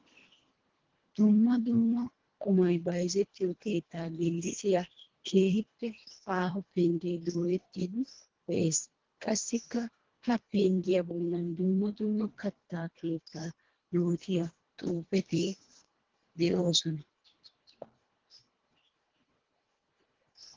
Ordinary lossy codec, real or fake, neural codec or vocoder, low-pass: Opus, 16 kbps; fake; codec, 24 kHz, 1.5 kbps, HILCodec; 7.2 kHz